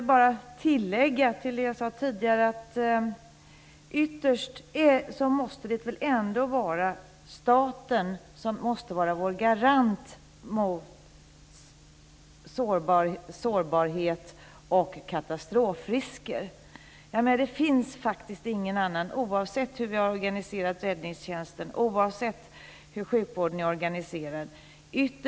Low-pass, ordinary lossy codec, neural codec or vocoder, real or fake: none; none; none; real